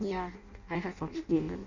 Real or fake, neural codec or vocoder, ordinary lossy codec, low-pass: fake; codec, 16 kHz in and 24 kHz out, 0.6 kbps, FireRedTTS-2 codec; none; 7.2 kHz